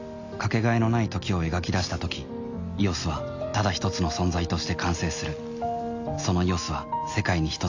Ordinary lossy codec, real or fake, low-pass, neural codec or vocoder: AAC, 48 kbps; real; 7.2 kHz; none